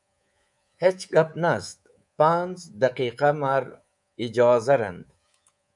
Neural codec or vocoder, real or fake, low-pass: codec, 24 kHz, 3.1 kbps, DualCodec; fake; 10.8 kHz